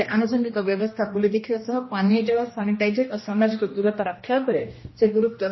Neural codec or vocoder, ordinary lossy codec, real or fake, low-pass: codec, 16 kHz, 1 kbps, X-Codec, HuBERT features, trained on general audio; MP3, 24 kbps; fake; 7.2 kHz